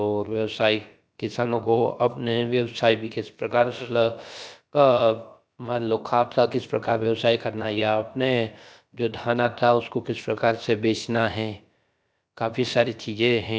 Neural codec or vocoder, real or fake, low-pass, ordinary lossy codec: codec, 16 kHz, about 1 kbps, DyCAST, with the encoder's durations; fake; none; none